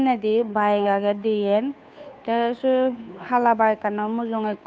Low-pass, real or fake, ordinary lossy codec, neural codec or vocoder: none; fake; none; codec, 16 kHz, 2 kbps, FunCodec, trained on Chinese and English, 25 frames a second